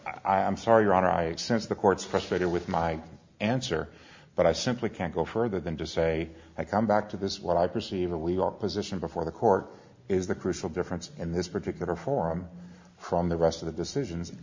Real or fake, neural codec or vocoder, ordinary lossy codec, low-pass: real; none; MP3, 64 kbps; 7.2 kHz